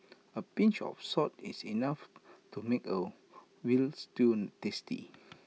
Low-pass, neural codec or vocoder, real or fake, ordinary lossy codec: none; none; real; none